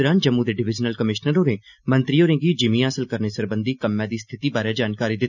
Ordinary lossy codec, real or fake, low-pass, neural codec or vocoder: none; real; 7.2 kHz; none